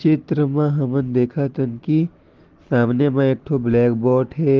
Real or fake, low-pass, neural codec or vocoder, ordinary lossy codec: real; 7.2 kHz; none; Opus, 16 kbps